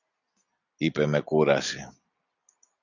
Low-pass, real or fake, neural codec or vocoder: 7.2 kHz; real; none